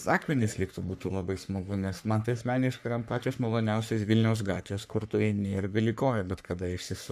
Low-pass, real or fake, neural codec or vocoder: 14.4 kHz; fake; codec, 44.1 kHz, 3.4 kbps, Pupu-Codec